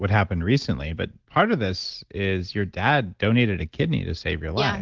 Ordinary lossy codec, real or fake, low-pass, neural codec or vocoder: Opus, 16 kbps; real; 7.2 kHz; none